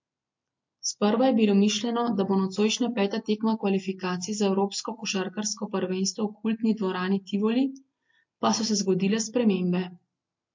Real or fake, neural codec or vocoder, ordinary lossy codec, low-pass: real; none; MP3, 48 kbps; 7.2 kHz